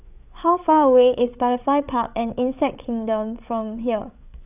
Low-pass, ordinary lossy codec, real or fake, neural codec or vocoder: 3.6 kHz; none; fake; codec, 16 kHz, 8 kbps, FreqCodec, larger model